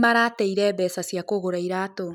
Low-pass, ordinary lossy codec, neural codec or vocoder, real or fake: 19.8 kHz; none; none; real